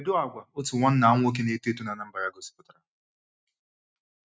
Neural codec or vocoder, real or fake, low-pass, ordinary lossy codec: none; real; none; none